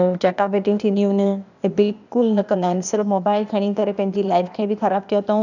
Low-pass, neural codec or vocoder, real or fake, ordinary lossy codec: 7.2 kHz; codec, 16 kHz, 0.8 kbps, ZipCodec; fake; none